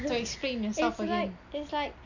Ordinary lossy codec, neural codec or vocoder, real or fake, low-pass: none; none; real; 7.2 kHz